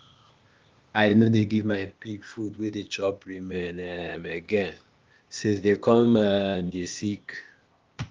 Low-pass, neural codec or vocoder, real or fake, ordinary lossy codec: 7.2 kHz; codec, 16 kHz, 0.8 kbps, ZipCodec; fake; Opus, 32 kbps